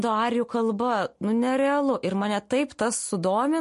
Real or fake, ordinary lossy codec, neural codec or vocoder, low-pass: real; MP3, 48 kbps; none; 14.4 kHz